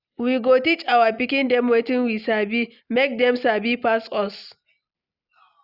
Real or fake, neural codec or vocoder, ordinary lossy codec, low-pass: real; none; Opus, 64 kbps; 5.4 kHz